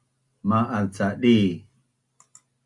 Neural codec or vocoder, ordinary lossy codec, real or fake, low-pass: none; Opus, 64 kbps; real; 10.8 kHz